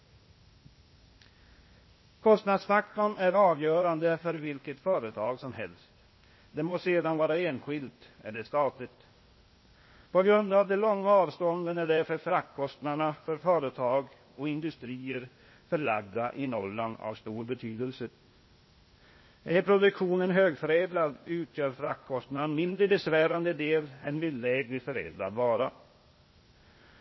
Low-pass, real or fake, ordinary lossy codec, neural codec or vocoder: 7.2 kHz; fake; MP3, 24 kbps; codec, 16 kHz, 0.8 kbps, ZipCodec